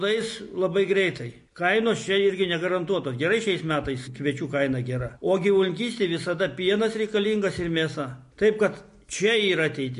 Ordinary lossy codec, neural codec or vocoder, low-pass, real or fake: MP3, 48 kbps; none; 10.8 kHz; real